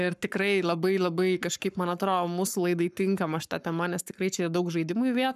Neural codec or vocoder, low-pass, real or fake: codec, 44.1 kHz, 7.8 kbps, Pupu-Codec; 14.4 kHz; fake